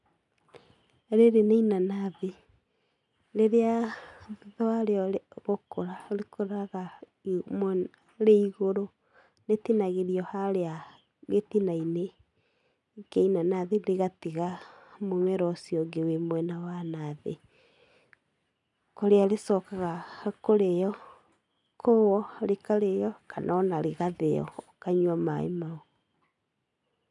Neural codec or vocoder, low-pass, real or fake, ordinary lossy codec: none; 10.8 kHz; real; none